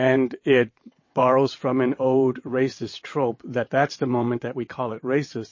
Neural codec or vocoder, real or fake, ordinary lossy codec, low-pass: vocoder, 22.05 kHz, 80 mel bands, WaveNeXt; fake; MP3, 32 kbps; 7.2 kHz